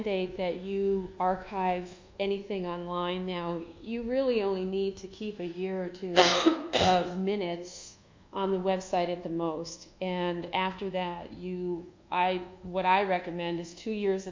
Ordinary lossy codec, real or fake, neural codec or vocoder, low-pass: MP3, 48 kbps; fake; codec, 24 kHz, 1.2 kbps, DualCodec; 7.2 kHz